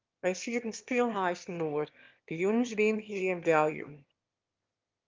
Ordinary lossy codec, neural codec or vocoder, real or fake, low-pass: Opus, 24 kbps; autoencoder, 22.05 kHz, a latent of 192 numbers a frame, VITS, trained on one speaker; fake; 7.2 kHz